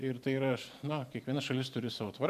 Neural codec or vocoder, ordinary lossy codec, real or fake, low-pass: vocoder, 44.1 kHz, 128 mel bands every 512 samples, BigVGAN v2; MP3, 96 kbps; fake; 14.4 kHz